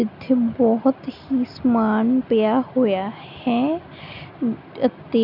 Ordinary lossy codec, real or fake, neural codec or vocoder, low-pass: none; real; none; 5.4 kHz